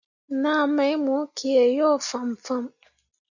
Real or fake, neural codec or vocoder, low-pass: real; none; 7.2 kHz